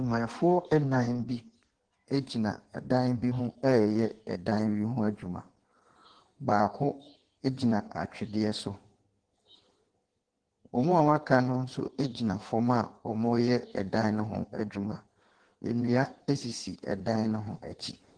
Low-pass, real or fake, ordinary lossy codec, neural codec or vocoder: 9.9 kHz; fake; Opus, 16 kbps; codec, 16 kHz in and 24 kHz out, 1.1 kbps, FireRedTTS-2 codec